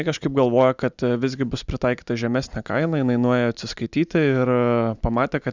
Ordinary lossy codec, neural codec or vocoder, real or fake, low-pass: Opus, 64 kbps; none; real; 7.2 kHz